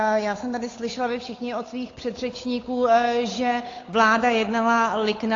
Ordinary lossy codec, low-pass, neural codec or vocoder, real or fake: AAC, 32 kbps; 7.2 kHz; codec, 16 kHz, 8 kbps, FunCodec, trained on Chinese and English, 25 frames a second; fake